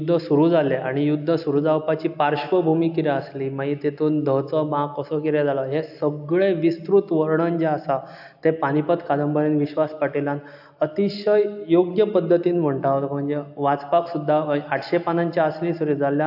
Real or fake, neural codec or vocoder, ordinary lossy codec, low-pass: real; none; AAC, 48 kbps; 5.4 kHz